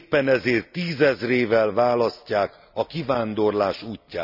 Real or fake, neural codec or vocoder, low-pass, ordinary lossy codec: real; none; 5.4 kHz; none